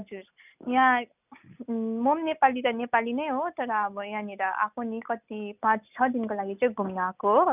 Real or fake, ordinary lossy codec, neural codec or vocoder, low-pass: real; none; none; 3.6 kHz